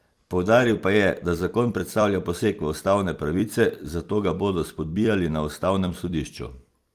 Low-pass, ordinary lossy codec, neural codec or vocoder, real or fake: 14.4 kHz; Opus, 32 kbps; vocoder, 44.1 kHz, 128 mel bands every 256 samples, BigVGAN v2; fake